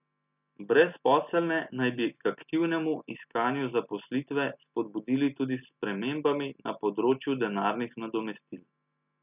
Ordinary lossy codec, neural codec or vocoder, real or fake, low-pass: none; none; real; 3.6 kHz